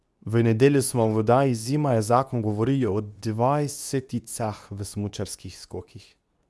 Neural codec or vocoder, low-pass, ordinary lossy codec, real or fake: codec, 24 kHz, 0.9 kbps, WavTokenizer, medium speech release version 2; none; none; fake